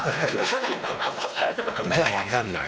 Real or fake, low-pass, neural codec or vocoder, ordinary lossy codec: fake; none; codec, 16 kHz, 1 kbps, X-Codec, WavLM features, trained on Multilingual LibriSpeech; none